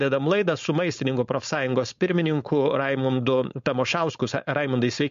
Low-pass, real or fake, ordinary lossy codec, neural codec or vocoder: 7.2 kHz; fake; MP3, 48 kbps; codec, 16 kHz, 4.8 kbps, FACodec